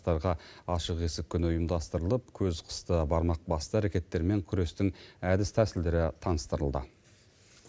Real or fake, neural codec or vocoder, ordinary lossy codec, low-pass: real; none; none; none